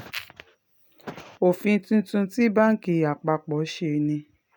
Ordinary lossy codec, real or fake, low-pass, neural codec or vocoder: none; real; none; none